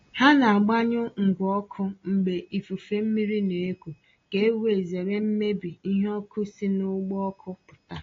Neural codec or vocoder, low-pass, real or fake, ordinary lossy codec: none; 7.2 kHz; real; AAC, 32 kbps